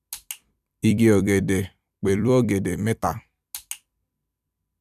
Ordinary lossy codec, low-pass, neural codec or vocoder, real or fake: none; 14.4 kHz; vocoder, 44.1 kHz, 128 mel bands every 256 samples, BigVGAN v2; fake